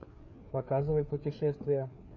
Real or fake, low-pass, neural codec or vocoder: fake; 7.2 kHz; codec, 16 kHz, 4 kbps, FreqCodec, larger model